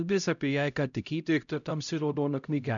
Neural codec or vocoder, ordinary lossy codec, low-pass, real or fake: codec, 16 kHz, 0.5 kbps, X-Codec, HuBERT features, trained on LibriSpeech; MP3, 96 kbps; 7.2 kHz; fake